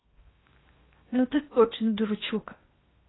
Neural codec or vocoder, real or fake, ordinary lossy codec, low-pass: codec, 16 kHz in and 24 kHz out, 0.8 kbps, FocalCodec, streaming, 65536 codes; fake; AAC, 16 kbps; 7.2 kHz